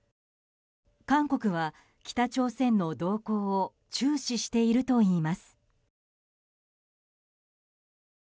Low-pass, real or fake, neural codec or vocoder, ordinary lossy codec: none; real; none; none